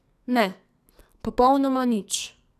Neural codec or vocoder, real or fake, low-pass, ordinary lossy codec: codec, 44.1 kHz, 2.6 kbps, SNAC; fake; 14.4 kHz; none